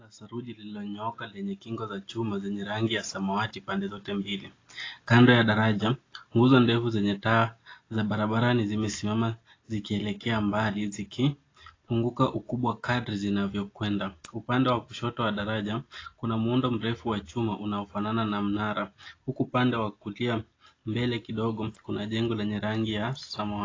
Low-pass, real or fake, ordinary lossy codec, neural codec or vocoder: 7.2 kHz; real; AAC, 32 kbps; none